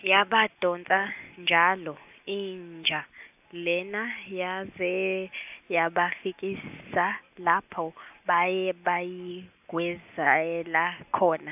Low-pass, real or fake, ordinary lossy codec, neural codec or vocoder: 3.6 kHz; real; AAC, 32 kbps; none